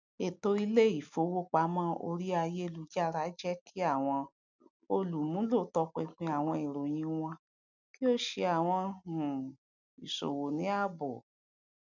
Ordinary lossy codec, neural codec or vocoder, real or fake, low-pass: none; none; real; 7.2 kHz